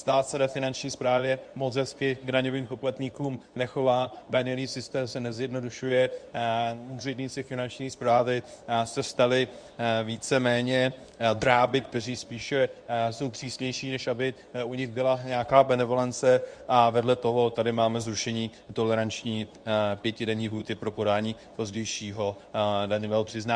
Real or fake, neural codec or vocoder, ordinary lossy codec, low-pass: fake; codec, 24 kHz, 0.9 kbps, WavTokenizer, medium speech release version 2; Opus, 64 kbps; 9.9 kHz